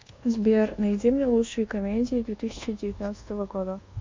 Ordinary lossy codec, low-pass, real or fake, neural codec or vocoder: MP3, 48 kbps; 7.2 kHz; fake; codec, 24 kHz, 1.2 kbps, DualCodec